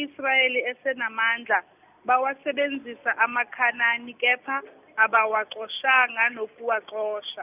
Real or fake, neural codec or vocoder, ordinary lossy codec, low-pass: real; none; none; 3.6 kHz